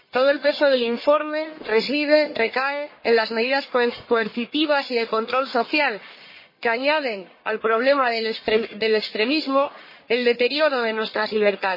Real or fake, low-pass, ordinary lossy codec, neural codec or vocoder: fake; 5.4 kHz; MP3, 24 kbps; codec, 44.1 kHz, 1.7 kbps, Pupu-Codec